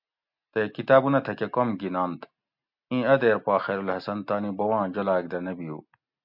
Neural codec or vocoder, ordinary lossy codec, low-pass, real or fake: none; MP3, 48 kbps; 5.4 kHz; real